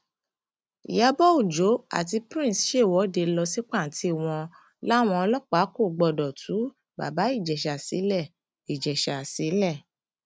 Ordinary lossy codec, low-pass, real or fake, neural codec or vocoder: none; none; real; none